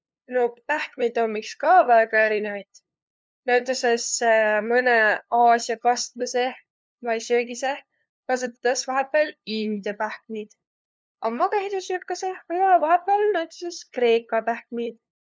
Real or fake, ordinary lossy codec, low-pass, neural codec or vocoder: fake; none; none; codec, 16 kHz, 2 kbps, FunCodec, trained on LibriTTS, 25 frames a second